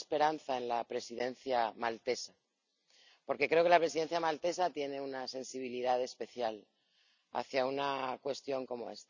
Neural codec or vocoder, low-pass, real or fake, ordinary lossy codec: none; 7.2 kHz; real; none